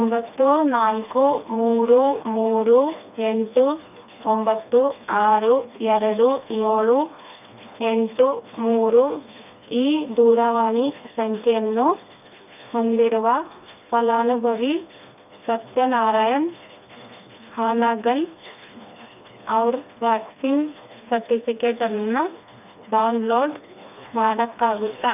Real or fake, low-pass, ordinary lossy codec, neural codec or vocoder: fake; 3.6 kHz; none; codec, 16 kHz, 2 kbps, FreqCodec, smaller model